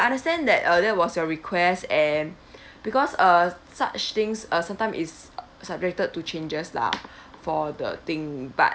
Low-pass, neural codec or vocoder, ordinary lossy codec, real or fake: none; none; none; real